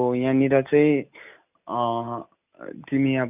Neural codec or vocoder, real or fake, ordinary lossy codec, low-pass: none; real; none; 3.6 kHz